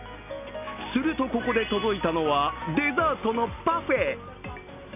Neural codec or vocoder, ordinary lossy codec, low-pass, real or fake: none; none; 3.6 kHz; real